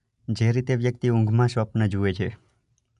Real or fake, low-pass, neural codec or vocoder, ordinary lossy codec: real; 10.8 kHz; none; none